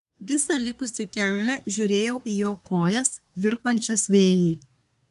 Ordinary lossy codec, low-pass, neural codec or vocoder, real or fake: MP3, 96 kbps; 10.8 kHz; codec, 24 kHz, 1 kbps, SNAC; fake